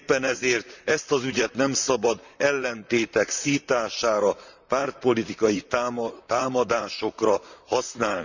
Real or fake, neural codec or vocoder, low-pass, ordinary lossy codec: fake; vocoder, 44.1 kHz, 128 mel bands, Pupu-Vocoder; 7.2 kHz; none